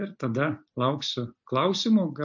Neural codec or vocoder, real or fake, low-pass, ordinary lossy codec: none; real; 7.2 kHz; MP3, 64 kbps